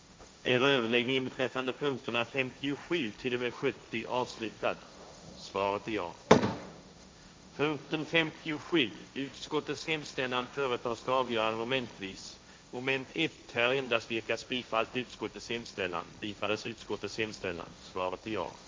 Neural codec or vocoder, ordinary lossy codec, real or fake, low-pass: codec, 16 kHz, 1.1 kbps, Voila-Tokenizer; none; fake; none